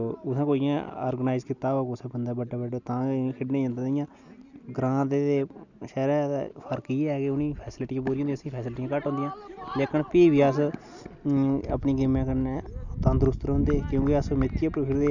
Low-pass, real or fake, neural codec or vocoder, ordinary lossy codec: 7.2 kHz; real; none; none